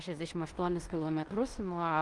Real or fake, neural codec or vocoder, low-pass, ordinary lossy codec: fake; codec, 16 kHz in and 24 kHz out, 0.9 kbps, LongCat-Audio-Codec, fine tuned four codebook decoder; 10.8 kHz; Opus, 24 kbps